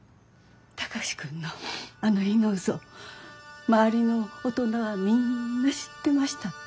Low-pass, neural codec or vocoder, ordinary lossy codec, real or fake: none; none; none; real